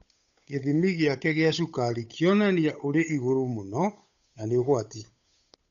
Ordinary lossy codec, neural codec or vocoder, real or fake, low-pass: none; codec, 16 kHz, 8 kbps, FunCodec, trained on Chinese and English, 25 frames a second; fake; 7.2 kHz